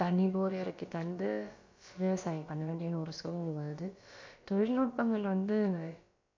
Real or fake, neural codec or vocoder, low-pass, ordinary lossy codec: fake; codec, 16 kHz, about 1 kbps, DyCAST, with the encoder's durations; 7.2 kHz; MP3, 48 kbps